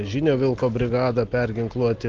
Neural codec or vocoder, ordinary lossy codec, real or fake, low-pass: none; Opus, 16 kbps; real; 7.2 kHz